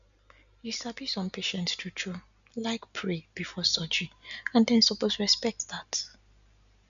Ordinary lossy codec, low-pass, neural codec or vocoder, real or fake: none; 7.2 kHz; none; real